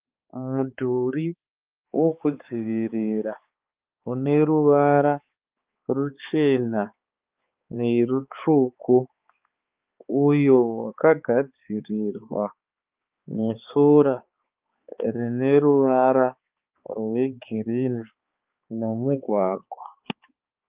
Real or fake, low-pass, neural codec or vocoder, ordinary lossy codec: fake; 3.6 kHz; codec, 16 kHz, 4 kbps, X-Codec, HuBERT features, trained on balanced general audio; Opus, 32 kbps